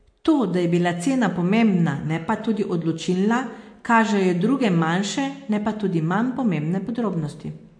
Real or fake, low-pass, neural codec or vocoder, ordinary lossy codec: real; 9.9 kHz; none; MP3, 48 kbps